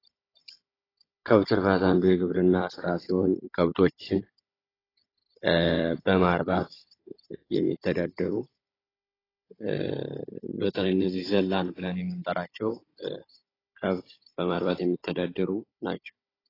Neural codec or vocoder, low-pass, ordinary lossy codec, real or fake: codec, 16 kHz, 16 kbps, FunCodec, trained on Chinese and English, 50 frames a second; 5.4 kHz; AAC, 24 kbps; fake